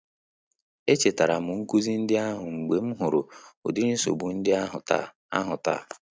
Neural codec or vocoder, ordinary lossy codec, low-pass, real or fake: none; none; none; real